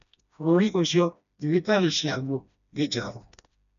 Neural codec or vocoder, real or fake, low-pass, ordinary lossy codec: codec, 16 kHz, 1 kbps, FreqCodec, smaller model; fake; 7.2 kHz; none